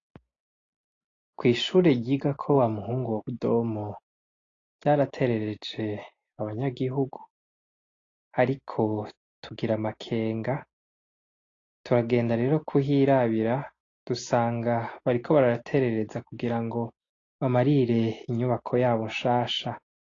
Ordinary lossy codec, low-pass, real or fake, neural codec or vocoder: AAC, 32 kbps; 7.2 kHz; real; none